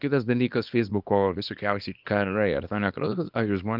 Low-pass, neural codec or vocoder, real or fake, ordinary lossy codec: 5.4 kHz; codec, 24 kHz, 0.9 kbps, WavTokenizer, small release; fake; Opus, 24 kbps